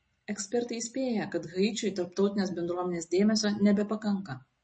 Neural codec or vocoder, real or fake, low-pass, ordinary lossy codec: none; real; 9.9 kHz; MP3, 32 kbps